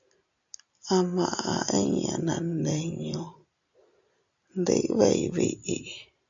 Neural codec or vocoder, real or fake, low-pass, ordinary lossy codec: none; real; 7.2 kHz; MP3, 64 kbps